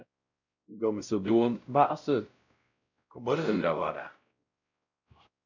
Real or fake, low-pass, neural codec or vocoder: fake; 7.2 kHz; codec, 16 kHz, 0.5 kbps, X-Codec, WavLM features, trained on Multilingual LibriSpeech